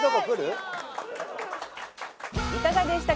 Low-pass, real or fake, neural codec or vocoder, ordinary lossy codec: none; real; none; none